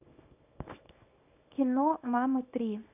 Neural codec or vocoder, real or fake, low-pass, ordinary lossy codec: codec, 24 kHz, 0.9 kbps, WavTokenizer, small release; fake; 3.6 kHz; AAC, 32 kbps